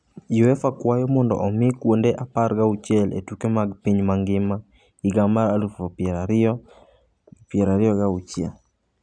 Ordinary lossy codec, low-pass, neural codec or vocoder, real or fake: none; 9.9 kHz; none; real